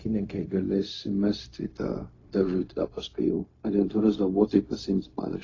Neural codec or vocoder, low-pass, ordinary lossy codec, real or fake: codec, 16 kHz, 0.4 kbps, LongCat-Audio-Codec; 7.2 kHz; AAC, 32 kbps; fake